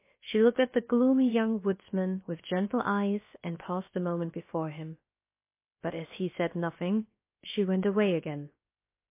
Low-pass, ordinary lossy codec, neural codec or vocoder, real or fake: 3.6 kHz; MP3, 24 kbps; codec, 16 kHz, about 1 kbps, DyCAST, with the encoder's durations; fake